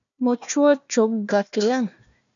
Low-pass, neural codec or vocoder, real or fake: 7.2 kHz; codec, 16 kHz, 1 kbps, FunCodec, trained on Chinese and English, 50 frames a second; fake